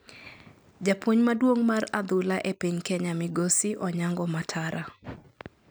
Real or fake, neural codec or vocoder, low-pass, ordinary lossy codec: real; none; none; none